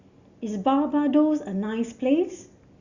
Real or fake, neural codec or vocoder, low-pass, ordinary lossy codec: real; none; 7.2 kHz; Opus, 64 kbps